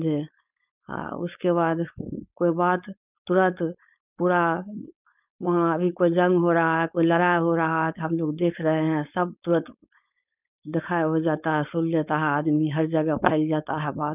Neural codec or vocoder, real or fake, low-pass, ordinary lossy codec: codec, 16 kHz, 4.8 kbps, FACodec; fake; 3.6 kHz; none